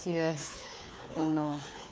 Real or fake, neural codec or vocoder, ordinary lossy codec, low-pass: fake; codec, 16 kHz, 4 kbps, FunCodec, trained on LibriTTS, 50 frames a second; none; none